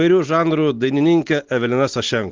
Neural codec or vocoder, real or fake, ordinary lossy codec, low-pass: none; real; Opus, 16 kbps; 7.2 kHz